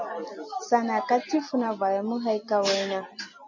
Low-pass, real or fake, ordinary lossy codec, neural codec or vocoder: 7.2 kHz; real; AAC, 48 kbps; none